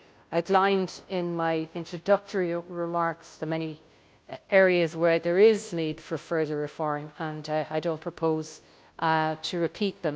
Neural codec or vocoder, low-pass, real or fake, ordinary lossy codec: codec, 16 kHz, 0.5 kbps, FunCodec, trained on Chinese and English, 25 frames a second; none; fake; none